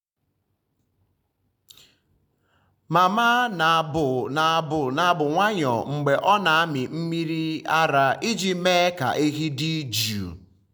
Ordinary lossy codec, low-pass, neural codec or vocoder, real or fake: none; none; none; real